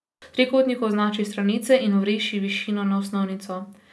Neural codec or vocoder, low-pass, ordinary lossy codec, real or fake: none; none; none; real